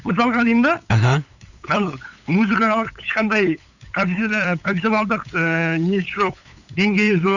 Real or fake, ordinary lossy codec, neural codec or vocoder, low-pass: fake; none; codec, 16 kHz, 8 kbps, FunCodec, trained on LibriTTS, 25 frames a second; 7.2 kHz